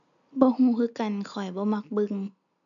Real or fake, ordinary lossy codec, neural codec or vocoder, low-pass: real; none; none; 7.2 kHz